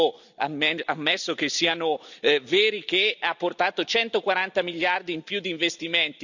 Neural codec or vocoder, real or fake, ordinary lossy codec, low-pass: none; real; none; 7.2 kHz